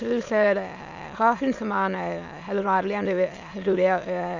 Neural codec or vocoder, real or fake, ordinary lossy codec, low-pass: autoencoder, 22.05 kHz, a latent of 192 numbers a frame, VITS, trained on many speakers; fake; AAC, 48 kbps; 7.2 kHz